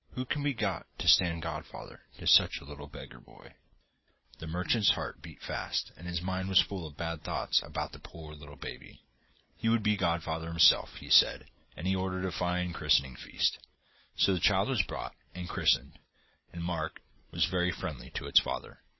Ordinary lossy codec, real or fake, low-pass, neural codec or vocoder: MP3, 24 kbps; real; 7.2 kHz; none